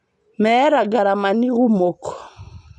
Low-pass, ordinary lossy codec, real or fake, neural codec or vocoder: 10.8 kHz; none; real; none